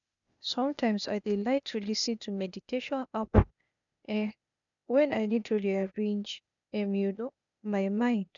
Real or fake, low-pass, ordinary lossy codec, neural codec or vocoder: fake; 7.2 kHz; none; codec, 16 kHz, 0.8 kbps, ZipCodec